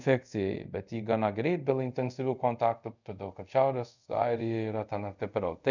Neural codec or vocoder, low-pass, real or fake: codec, 24 kHz, 0.5 kbps, DualCodec; 7.2 kHz; fake